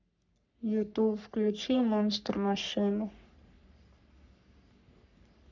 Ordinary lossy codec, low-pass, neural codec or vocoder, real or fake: none; 7.2 kHz; codec, 44.1 kHz, 3.4 kbps, Pupu-Codec; fake